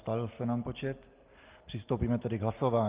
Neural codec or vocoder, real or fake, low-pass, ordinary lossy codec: none; real; 3.6 kHz; Opus, 32 kbps